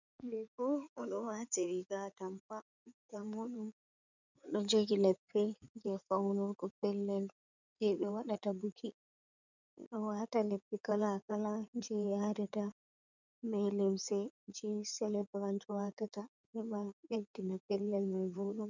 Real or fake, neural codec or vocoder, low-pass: fake; codec, 16 kHz in and 24 kHz out, 2.2 kbps, FireRedTTS-2 codec; 7.2 kHz